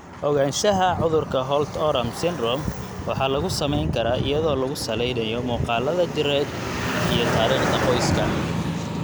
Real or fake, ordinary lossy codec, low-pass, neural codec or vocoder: real; none; none; none